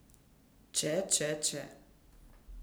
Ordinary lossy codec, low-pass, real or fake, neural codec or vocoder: none; none; real; none